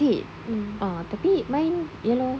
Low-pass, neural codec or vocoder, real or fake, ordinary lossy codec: none; none; real; none